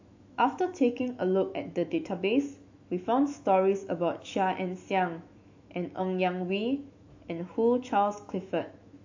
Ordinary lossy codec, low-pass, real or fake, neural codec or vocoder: AAC, 48 kbps; 7.2 kHz; fake; autoencoder, 48 kHz, 128 numbers a frame, DAC-VAE, trained on Japanese speech